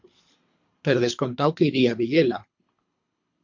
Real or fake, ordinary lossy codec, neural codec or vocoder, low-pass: fake; MP3, 48 kbps; codec, 24 kHz, 3 kbps, HILCodec; 7.2 kHz